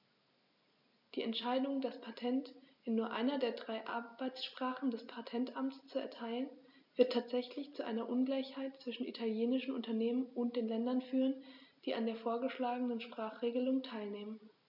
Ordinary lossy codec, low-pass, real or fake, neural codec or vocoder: none; 5.4 kHz; real; none